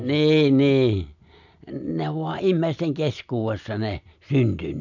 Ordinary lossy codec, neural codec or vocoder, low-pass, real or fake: none; none; 7.2 kHz; real